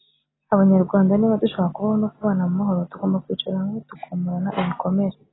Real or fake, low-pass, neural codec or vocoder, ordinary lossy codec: real; 7.2 kHz; none; AAC, 16 kbps